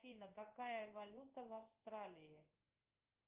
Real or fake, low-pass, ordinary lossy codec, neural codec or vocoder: fake; 3.6 kHz; Opus, 24 kbps; codec, 16 kHz in and 24 kHz out, 1 kbps, XY-Tokenizer